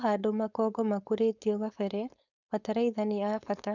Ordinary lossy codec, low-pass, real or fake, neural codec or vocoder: none; 7.2 kHz; fake; codec, 16 kHz, 4.8 kbps, FACodec